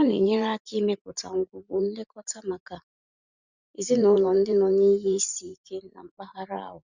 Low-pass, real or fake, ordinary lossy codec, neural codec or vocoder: 7.2 kHz; fake; Opus, 64 kbps; vocoder, 44.1 kHz, 128 mel bands every 512 samples, BigVGAN v2